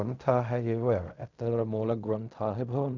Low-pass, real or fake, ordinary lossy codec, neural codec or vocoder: 7.2 kHz; fake; none; codec, 16 kHz in and 24 kHz out, 0.4 kbps, LongCat-Audio-Codec, fine tuned four codebook decoder